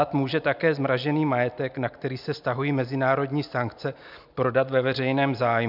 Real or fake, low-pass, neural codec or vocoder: real; 5.4 kHz; none